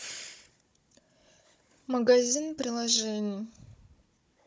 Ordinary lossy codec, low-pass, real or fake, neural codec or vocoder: none; none; fake; codec, 16 kHz, 16 kbps, FunCodec, trained on Chinese and English, 50 frames a second